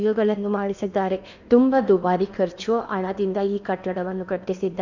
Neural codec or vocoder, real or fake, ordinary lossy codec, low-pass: codec, 16 kHz in and 24 kHz out, 0.8 kbps, FocalCodec, streaming, 65536 codes; fake; none; 7.2 kHz